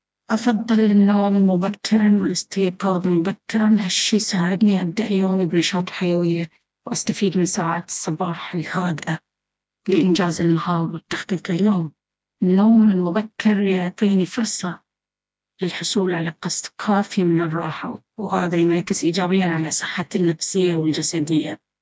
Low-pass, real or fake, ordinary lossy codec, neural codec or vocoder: none; fake; none; codec, 16 kHz, 1 kbps, FreqCodec, smaller model